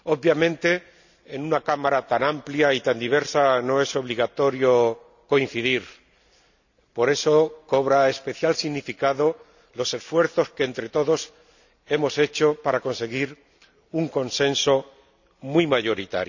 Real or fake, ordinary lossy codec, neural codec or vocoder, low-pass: real; none; none; 7.2 kHz